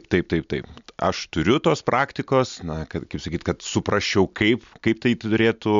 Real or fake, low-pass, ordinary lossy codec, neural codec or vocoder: real; 7.2 kHz; MP3, 64 kbps; none